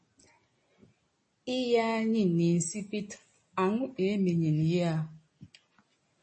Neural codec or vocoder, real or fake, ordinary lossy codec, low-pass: none; real; MP3, 32 kbps; 9.9 kHz